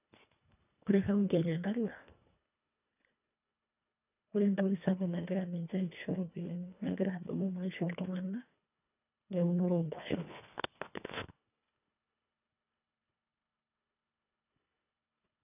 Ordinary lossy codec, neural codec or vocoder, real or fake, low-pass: none; codec, 24 kHz, 1.5 kbps, HILCodec; fake; 3.6 kHz